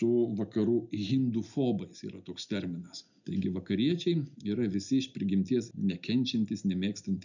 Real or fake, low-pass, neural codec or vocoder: real; 7.2 kHz; none